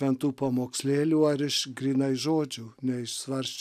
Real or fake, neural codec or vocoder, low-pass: real; none; 14.4 kHz